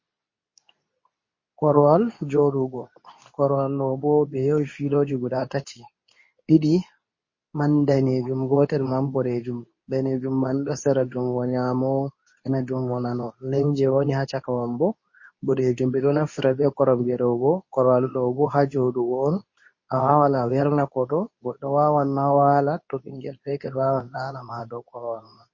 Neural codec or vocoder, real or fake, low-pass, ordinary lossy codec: codec, 24 kHz, 0.9 kbps, WavTokenizer, medium speech release version 2; fake; 7.2 kHz; MP3, 32 kbps